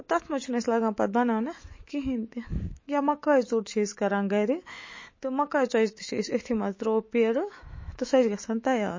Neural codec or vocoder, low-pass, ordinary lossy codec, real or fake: autoencoder, 48 kHz, 128 numbers a frame, DAC-VAE, trained on Japanese speech; 7.2 kHz; MP3, 32 kbps; fake